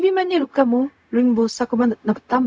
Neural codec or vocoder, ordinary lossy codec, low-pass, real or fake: codec, 16 kHz, 0.4 kbps, LongCat-Audio-Codec; none; none; fake